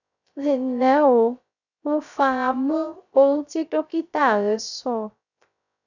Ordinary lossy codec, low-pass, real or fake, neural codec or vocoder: none; 7.2 kHz; fake; codec, 16 kHz, 0.3 kbps, FocalCodec